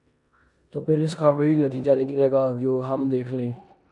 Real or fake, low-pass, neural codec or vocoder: fake; 10.8 kHz; codec, 16 kHz in and 24 kHz out, 0.9 kbps, LongCat-Audio-Codec, four codebook decoder